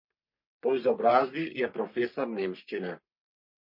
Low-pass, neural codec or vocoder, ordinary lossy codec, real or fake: 5.4 kHz; codec, 44.1 kHz, 3.4 kbps, Pupu-Codec; MP3, 32 kbps; fake